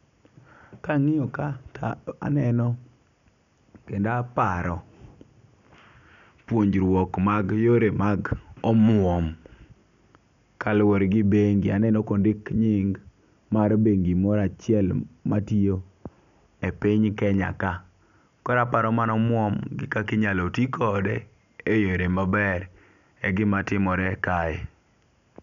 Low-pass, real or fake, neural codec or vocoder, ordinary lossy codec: 7.2 kHz; real; none; none